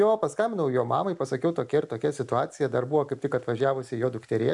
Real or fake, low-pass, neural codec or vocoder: real; 10.8 kHz; none